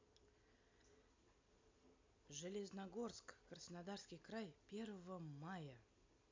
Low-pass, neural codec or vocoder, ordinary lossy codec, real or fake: 7.2 kHz; none; MP3, 64 kbps; real